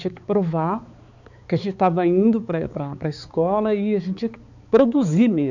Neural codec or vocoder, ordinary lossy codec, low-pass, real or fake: codec, 16 kHz, 4 kbps, X-Codec, HuBERT features, trained on balanced general audio; none; 7.2 kHz; fake